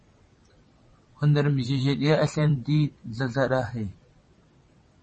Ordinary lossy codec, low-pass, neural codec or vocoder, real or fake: MP3, 32 kbps; 9.9 kHz; vocoder, 22.05 kHz, 80 mel bands, WaveNeXt; fake